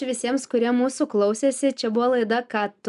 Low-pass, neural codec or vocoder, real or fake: 10.8 kHz; none; real